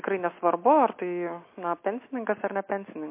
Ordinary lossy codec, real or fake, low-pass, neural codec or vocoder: MP3, 24 kbps; real; 3.6 kHz; none